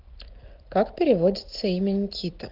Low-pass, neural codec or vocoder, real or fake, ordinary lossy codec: 5.4 kHz; none; real; Opus, 16 kbps